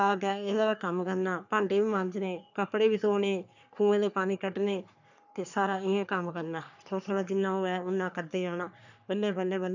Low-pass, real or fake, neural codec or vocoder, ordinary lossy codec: 7.2 kHz; fake; codec, 44.1 kHz, 3.4 kbps, Pupu-Codec; none